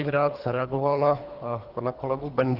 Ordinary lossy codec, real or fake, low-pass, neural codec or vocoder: Opus, 16 kbps; fake; 5.4 kHz; codec, 24 kHz, 3 kbps, HILCodec